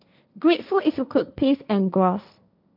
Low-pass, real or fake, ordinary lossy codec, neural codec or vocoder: 5.4 kHz; fake; none; codec, 16 kHz, 1.1 kbps, Voila-Tokenizer